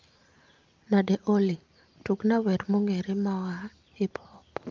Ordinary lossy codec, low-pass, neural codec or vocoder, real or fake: Opus, 32 kbps; 7.2 kHz; none; real